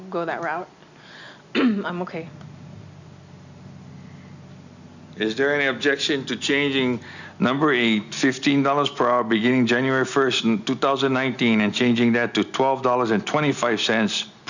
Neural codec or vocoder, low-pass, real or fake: none; 7.2 kHz; real